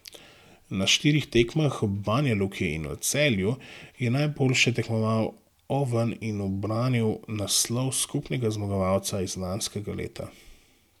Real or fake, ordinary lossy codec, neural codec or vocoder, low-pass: real; none; none; 19.8 kHz